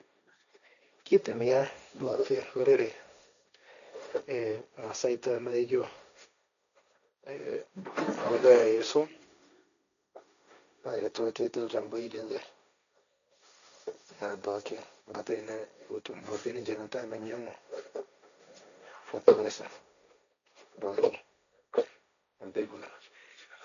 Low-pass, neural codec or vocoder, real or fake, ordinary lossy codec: 7.2 kHz; codec, 16 kHz, 1.1 kbps, Voila-Tokenizer; fake; none